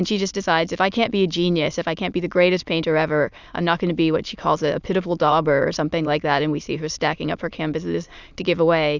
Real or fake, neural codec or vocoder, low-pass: fake; autoencoder, 22.05 kHz, a latent of 192 numbers a frame, VITS, trained on many speakers; 7.2 kHz